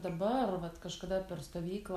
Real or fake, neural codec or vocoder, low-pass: real; none; 14.4 kHz